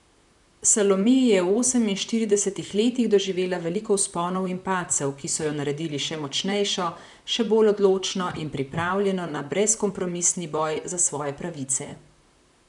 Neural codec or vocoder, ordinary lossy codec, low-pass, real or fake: vocoder, 44.1 kHz, 128 mel bands, Pupu-Vocoder; none; 10.8 kHz; fake